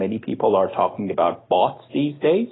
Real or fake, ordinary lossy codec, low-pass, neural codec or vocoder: real; AAC, 16 kbps; 7.2 kHz; none